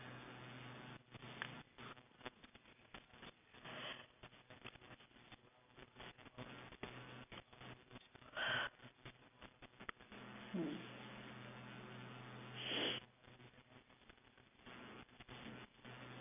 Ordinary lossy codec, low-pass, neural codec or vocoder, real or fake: none; 3.6 kHz; none; real